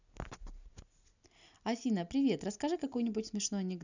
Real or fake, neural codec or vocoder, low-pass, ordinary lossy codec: real; none; 7.2 kHz; none